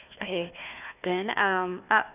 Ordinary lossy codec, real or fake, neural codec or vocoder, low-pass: none; fake; codec, 16 kHz, 1 kbps, FunCodec, trained on Chinese and English, 50 frames a second; 3.6 kHz